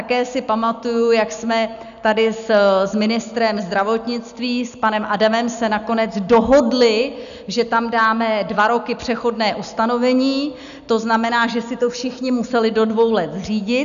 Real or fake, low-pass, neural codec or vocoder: real; 7.2 kHz; none